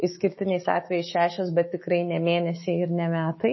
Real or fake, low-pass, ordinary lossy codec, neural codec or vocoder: fake; 7.2 kHz; MP3, 24 kbps; autoencoder, 48 kHz, 128 numbers a frame, DAC-VAE, trained on Japanese speech